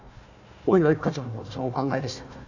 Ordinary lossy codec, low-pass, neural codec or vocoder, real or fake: none; 7.2 kHz; codec, 16 kHz, 1 kbps, FunCodec, trained on Chinese and English, 50 frames a second; fake